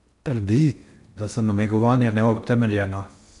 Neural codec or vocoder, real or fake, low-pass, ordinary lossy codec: codec, 16 kHz in and 24 kHz out, 0.6 kbps, FocalCodec, streaming, 4096 codes; fake; 10.8 kHz; none